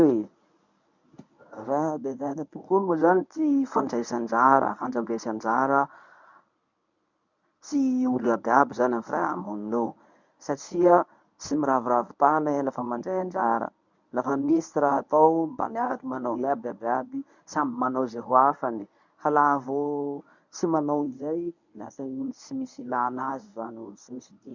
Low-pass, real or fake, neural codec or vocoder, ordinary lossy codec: 7.2 kHz; fake; codec, 24 kHz, 0.9 kbps, WavTokenizer, medium speech release version 1; none